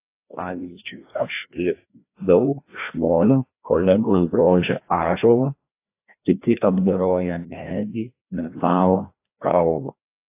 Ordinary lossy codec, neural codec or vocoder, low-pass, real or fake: AAC, 24 kbps; codec, 16 kHz, 1 kbps, FreqCodec, larger model; 3.6 kHz; fake